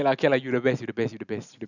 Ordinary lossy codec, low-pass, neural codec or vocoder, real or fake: none; 7.2 kHz; none; real